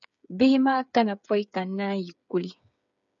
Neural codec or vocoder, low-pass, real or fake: codec, 16 kHz, 8 kbps, FreqCodec, smaller model; 7.2 kHz; fake